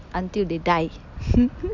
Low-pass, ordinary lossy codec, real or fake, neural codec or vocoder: 7.2 kHz; none; real; none